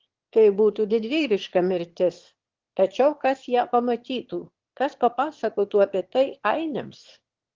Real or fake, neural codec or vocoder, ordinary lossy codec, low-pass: fake; autoencoder, 22.05 kHz, a latent of 192 numbers a frame, VITS, trained on one speaker; Opus, 16 kbps; 7.2 kHz